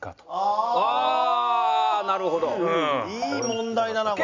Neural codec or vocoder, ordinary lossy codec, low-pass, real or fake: none; MP3, 48 kbps; 7.2 kHz; real